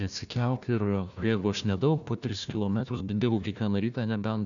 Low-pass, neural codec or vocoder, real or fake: 7.2 kHz; codec, 16 kHz, 1 kbps, FunCodec, trained on Chinese and English, 50 frames a second; fake